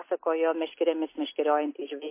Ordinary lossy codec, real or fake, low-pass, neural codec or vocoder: MP3, 24 kbps; real; 3.6 kHz; none